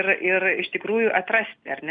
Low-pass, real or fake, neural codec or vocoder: 9.9 kHz; real; none